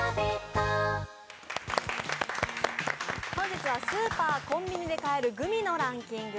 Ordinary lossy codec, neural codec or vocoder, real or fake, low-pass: none; none; real; none